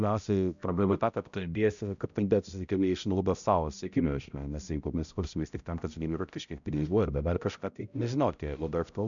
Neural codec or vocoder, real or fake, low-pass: codec, 16 kHz, 0.5 kbps, X-Codec, HuBERT features, trained on balanced general audio; fake; 7.2 kHz